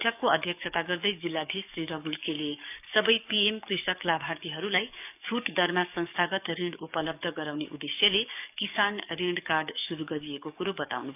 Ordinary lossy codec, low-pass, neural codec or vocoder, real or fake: none; 3.6 kHz; codec, 44.1 kHz, 7.8 kbps, DAC; fake